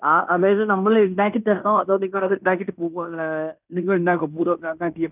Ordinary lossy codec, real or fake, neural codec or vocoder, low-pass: none; fake; codec, 16 kHz in and 24 kHz out, 0.9 kbps, LongCat-Audio-Codec, fine tuned four codebook decoder; 3.6 kHz